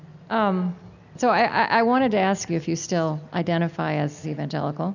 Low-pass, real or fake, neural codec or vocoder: 7.2 kHz; real; none